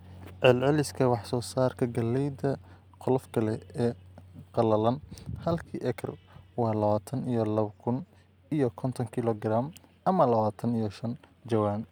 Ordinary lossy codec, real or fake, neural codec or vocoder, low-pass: none; real; none; none